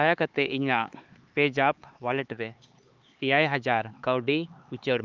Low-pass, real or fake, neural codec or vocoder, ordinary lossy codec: 7.2 kHz; fake; codec, 16 kHz, 4 kbps, X-Codec, HuBERT features, trained on LibriSpeech; Opus, 24 kbps